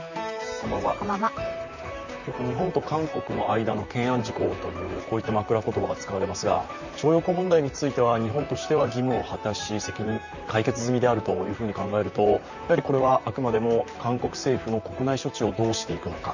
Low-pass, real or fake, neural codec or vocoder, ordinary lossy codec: 7.2 kHz; fake; vocoder, 44.1 kHz, 128 mel bands, Pupu-Vocoder; none